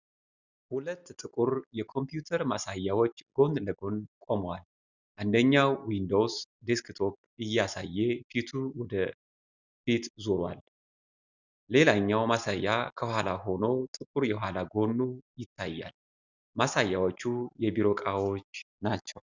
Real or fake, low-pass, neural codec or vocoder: real; 7.2 kHz; none